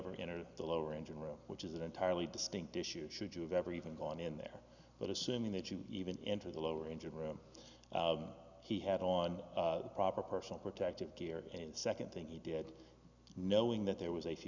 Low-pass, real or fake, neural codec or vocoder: 7.2 kHz; real; none